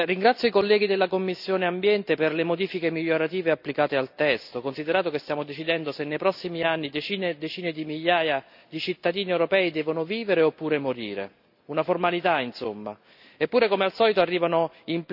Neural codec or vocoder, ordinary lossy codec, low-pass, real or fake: none; none; 5.4 kHz; real